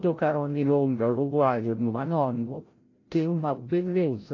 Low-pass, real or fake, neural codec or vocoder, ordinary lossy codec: 7.2 kHz; fake; codec, 16 kHz, 0.5 kbps, FreqCodec, larger model; AAC, 32 kbps